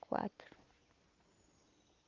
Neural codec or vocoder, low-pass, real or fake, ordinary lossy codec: none; 7.2 kHz; real; Opus, 24 kbps